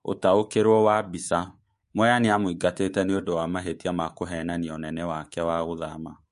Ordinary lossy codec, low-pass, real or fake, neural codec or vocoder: MP3, 48 kbps; 10.8 kHz; fake; codec, 24 kHz, 3.1 kbps, DualCodec